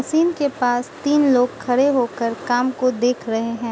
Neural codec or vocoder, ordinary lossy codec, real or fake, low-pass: none; none; real; none